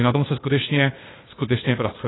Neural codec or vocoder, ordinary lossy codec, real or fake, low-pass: codec, 16 kHz, 0.8 kbps, ZipCodec; AAC, 16 kbps; fake; 7.2 kHz